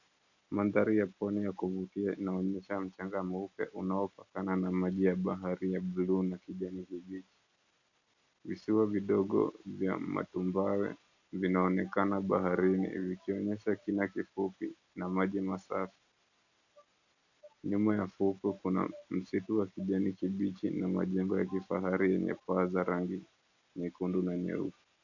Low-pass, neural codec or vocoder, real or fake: 7.2 kHz; none; real